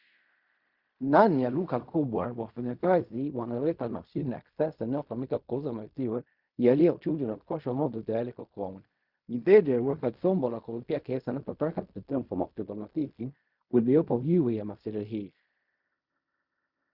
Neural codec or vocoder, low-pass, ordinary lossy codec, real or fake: codec, 16 kHz in and 24 kHz out, 0.4 kbps, LongCat-Audio-Codec, fine tuned four codebook decoder; 5.4 kHz; Opus, 64 kbps; fake